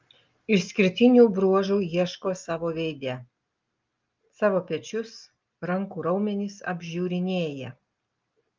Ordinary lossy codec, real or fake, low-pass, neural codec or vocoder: Opus, 24 kbps; real; 7.2 kHz; none